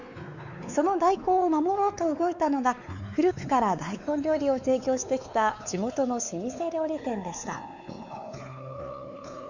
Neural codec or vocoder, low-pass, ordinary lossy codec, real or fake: codec, 16 kHz, 4 kbps, X-Codec, WavLM features, trained on Multilingual LibriSpeech; 7.2 kHz; none; fake